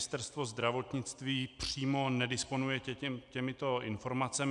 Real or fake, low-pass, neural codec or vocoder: real; 10.8 kHz; none